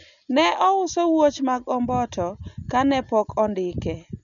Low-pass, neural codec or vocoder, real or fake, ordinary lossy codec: 7.2 kHz; none; real; none